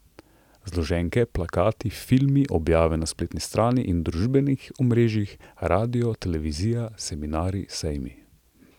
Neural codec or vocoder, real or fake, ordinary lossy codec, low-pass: none; real; none; 19.8 kHz